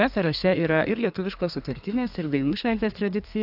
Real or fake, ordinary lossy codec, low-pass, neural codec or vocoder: fake; AAC, 48 kbps; 5.4 kHz; codec, 32 kHz, 1.9 kbps, SNAC